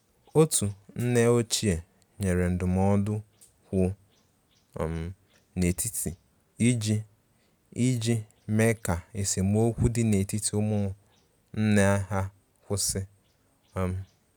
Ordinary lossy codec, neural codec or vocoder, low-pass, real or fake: none; none; none; real